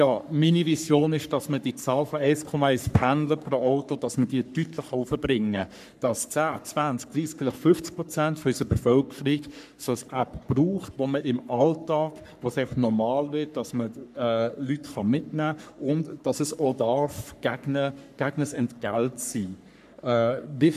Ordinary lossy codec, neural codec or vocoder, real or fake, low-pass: none; codec, 44.1 kHz, 3.4 kbps, Pupu-Codec; fake; 14.4 kHz